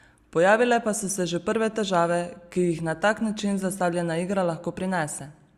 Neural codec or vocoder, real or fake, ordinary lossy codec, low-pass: none; real; Opus, 64 kbps; 14.4 kHz